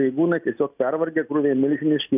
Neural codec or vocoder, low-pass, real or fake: none; 3.6 kHz; real